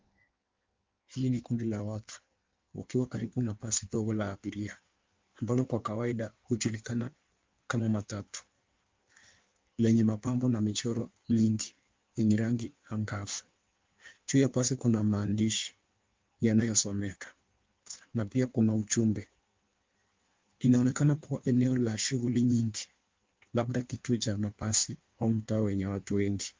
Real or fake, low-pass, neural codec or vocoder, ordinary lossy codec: fake; 7.2 kHz; codec, 16 kHz in and 24 kHz out, 1.1 kbps, FireRedTTS-2 codec; Opus, 32 kbps